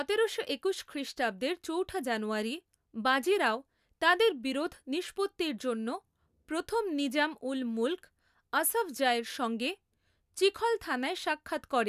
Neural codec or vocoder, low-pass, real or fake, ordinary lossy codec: none; 14.4 kHz; real; none